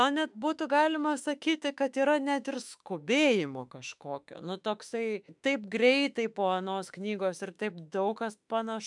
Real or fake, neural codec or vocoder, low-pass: fake; autoencoder, 48 kHz, 32 numbers a frame, DAC-VAE, trained on Japanese speech; 10.8 kHz